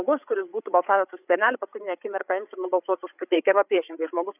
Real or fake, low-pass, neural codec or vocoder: fake; 3.6 kHz; codec, 16 kHz, 8 kbps, FreqCodec, larger model